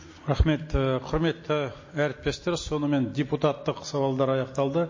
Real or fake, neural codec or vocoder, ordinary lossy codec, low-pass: real; none; MP3, 32 kbps; 7.2 kHz